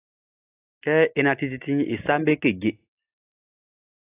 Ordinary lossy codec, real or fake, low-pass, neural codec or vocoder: AAC, 24 kbps; real; 3.6 kHz; none